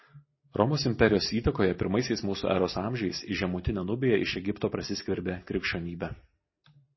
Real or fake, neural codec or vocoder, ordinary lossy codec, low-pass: real; none; MP3, 24 kbps; 7.2 kHz